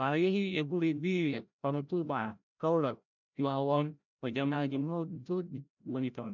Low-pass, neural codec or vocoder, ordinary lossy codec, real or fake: 7.2 kHz; codec, 16 kHz, 0.5 kbps, FreqCodec, larger model; none; fake